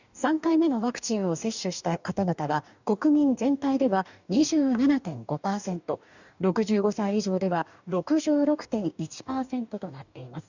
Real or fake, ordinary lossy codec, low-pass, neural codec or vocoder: fake; none; 7.2 kHz; codec, 44.1 kHz, 2.6 kbps, DAC